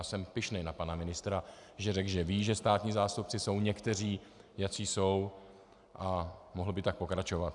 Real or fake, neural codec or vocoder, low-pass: real; none; 10.8 kHz